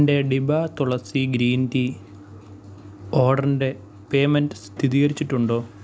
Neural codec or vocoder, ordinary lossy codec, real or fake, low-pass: none; none; real; none